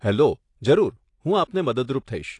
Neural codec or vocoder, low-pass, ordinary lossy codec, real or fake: none; 10.8 kHz; AAC, 48 kbps; real